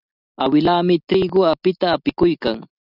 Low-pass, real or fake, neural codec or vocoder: 5.4 kHz; real; none